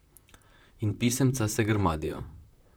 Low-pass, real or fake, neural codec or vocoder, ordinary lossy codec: none; fake; vocoder, 44.1 kHz, 128 mel bands, Pupu-Vocoder; none